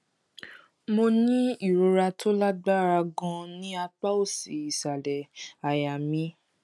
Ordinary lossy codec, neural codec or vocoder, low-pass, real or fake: none; none; none; real